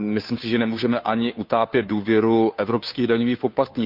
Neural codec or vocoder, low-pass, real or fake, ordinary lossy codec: codec, 16 kHz, 2 kbps, FunCodec, trained on Chinese and English, 25 frames a second; 5.4 kHz; fake; Opus, 64 kbps